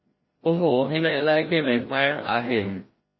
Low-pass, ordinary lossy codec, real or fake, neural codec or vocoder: 7.2 kHz; MP3, 24 kbps; fake; codec, 16 kHz, 1 kbps, FreqCodec, larger model